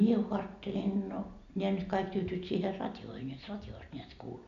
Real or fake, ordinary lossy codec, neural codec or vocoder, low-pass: real; MP3, 64 kbps; none; 7.2 kHz